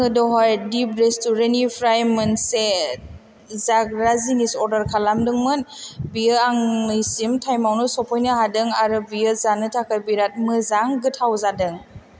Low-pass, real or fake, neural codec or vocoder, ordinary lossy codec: none; real; none; none